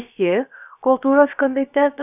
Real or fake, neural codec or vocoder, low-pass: fake; codec, 16 kHz, about 1 kbps, DyCAST, with the encoder's durations; 3.6 kHz